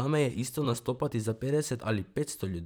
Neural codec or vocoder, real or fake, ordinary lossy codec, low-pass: vocoder, 44.1 kHz, 128 mel bands, Pupu-Vocoder; fake; none; none